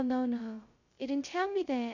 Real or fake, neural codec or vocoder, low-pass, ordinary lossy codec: fake; codec, 16 kHz, 0.2 kbps, FocalCodec; 7.2 kHz; none